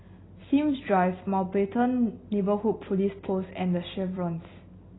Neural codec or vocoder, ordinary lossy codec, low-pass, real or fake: none; AAC, 16 kbps; 7.2 kHz; real